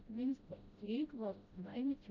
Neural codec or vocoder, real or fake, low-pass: codec, 16 kHz, 0.5 kbps, FreqCodec, smaller model; fake; 7.2 kHz